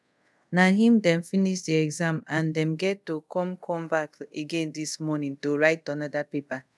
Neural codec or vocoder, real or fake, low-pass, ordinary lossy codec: codec, 24 kHz, 0.5 kbps, DualCodec; fake; none; none